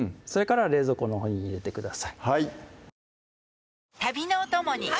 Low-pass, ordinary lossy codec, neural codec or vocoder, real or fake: none; none; none; real